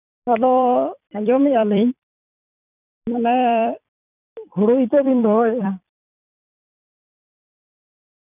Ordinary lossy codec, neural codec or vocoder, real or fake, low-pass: none; vocoder, 44.1 kHz, 128 mel bands every 256 samples, BigVGAN v2; fake; 3.6 kHz